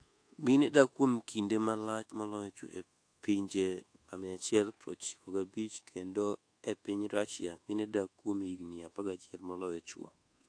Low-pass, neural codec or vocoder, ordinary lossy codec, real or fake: 9.9 kHz; codec, 24 kHz, 1.2 kbps, DualCodec; AAC, 48 kbps; fake